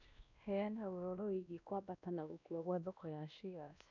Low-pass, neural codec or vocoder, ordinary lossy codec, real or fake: 7.2 kHz; codec, 16 kHz, 1 kbps, X-Codec, WavLM features, trained on Multilingual LibriSpeech; none; fake